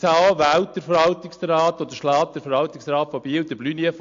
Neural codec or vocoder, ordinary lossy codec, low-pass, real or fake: none; AAC, 48 kbps; 7.2 kHz; real